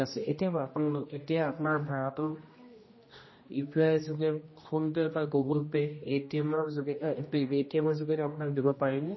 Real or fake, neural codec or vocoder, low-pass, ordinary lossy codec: fake; codec, 16 kHz, 1 kbps, X-Codec, HuBERT features, trained on general audio; 7.2 kHz; MP3, 24 kbps